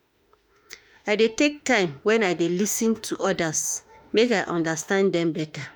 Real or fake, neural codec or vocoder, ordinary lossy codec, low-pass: fake; autoencoder, 48 kHz, 32 numbers a frame, DAC-VAE, trained on Japanese speech; none; none